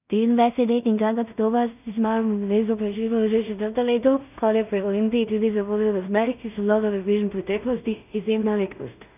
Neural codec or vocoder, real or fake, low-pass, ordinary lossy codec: codec, 16 kHz in and 24 kHz out, 0.4 kbps, LongCat-Audio-Codec, two codebook decoder; fake; 3.6 kHz; none